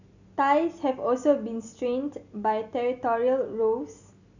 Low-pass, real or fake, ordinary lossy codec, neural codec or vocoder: 7.2 kHz; real; none; none